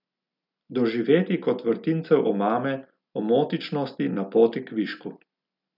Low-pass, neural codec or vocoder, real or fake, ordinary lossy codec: 5.4 kHz; none; real; none